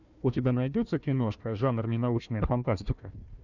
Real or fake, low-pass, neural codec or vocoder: fake; 7.2 kHz; codec, 16 kHz, 1 kbps, FunCodec, trained on Chinese and English, 50 frames a second